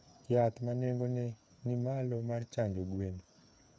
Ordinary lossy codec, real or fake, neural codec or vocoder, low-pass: none; fake; codec, 16 kHz, 8 kbps, FreqCodec, smaller model; none